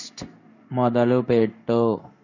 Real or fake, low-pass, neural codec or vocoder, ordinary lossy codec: fake; 7.2 kHz; codec, 16 kHz in and 24 kHz out, 1 kbps, XY-Tokenizer; none